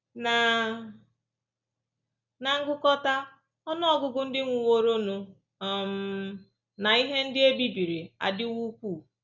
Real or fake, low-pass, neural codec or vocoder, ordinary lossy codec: real; 7.2 kHz; none; none